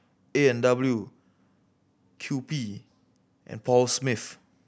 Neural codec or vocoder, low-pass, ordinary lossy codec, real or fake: none; none; none; real